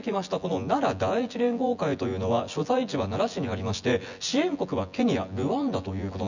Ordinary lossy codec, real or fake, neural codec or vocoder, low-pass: none; fake; vocoder, 24 kHz, 100 mel bands, Vocos; 7.2 kHz